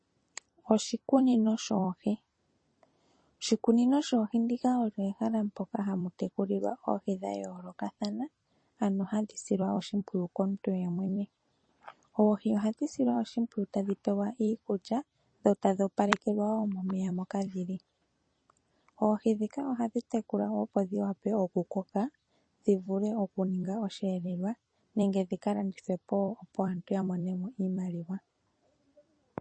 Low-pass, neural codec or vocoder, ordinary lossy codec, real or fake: 9.9 kHz; vocoder, 44.1 kHz, 128 mel bands every 256 samples, BigVGAN v2; MP3, 32 kbps; fake